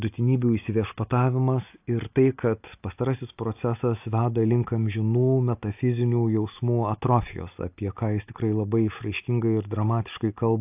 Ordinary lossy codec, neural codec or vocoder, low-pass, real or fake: AAC, 32 kbps; none; 3.6 kHz; real